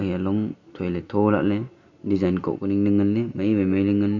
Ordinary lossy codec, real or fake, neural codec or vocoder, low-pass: none; real; none; 7.2 kHz